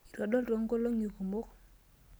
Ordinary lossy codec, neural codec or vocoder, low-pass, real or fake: none; none; none; real